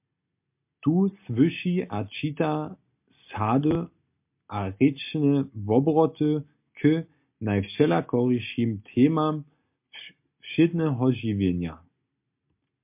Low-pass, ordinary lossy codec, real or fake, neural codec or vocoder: 3.6 kHz; MP3, 32 kbps; real; none